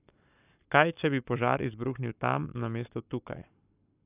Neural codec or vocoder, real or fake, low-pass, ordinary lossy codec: codec, 44.1 kHz, 7.8 kbps, Pupu-Codec; fake; 3.6 kHz; none